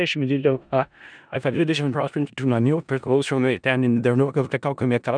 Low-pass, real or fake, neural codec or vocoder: 9.9 kHz; fake; codec, 16 kHz in and 24 kHz out, 0.4 kbps, LongCat-Audio-Codec, four codebook decoder